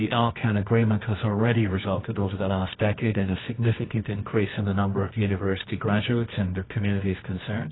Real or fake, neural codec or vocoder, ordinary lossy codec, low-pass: fake; codec, 24 kHz, 0.9 kbps, WavTokenizer, medium music audio release; AAC, 16 kbps; 7.2 kHz